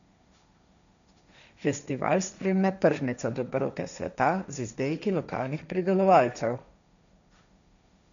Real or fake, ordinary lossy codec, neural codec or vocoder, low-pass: fake; none; codec, 16 kHz, 1.1 kbps, Voila-Tokenizer; 7.2 kHz